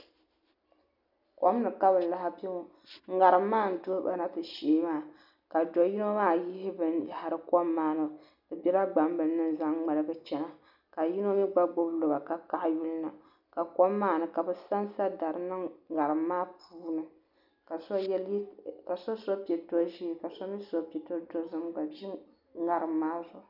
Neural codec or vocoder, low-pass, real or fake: none; 5.4 kHz; real